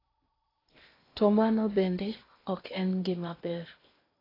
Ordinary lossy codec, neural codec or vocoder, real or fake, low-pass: AAC, 24 kbps; codec, 16 kHz in and 24 kHz out, 0.8 kbps, FocalCodec, streaming, 65536 codes; fake; 5.4 kHz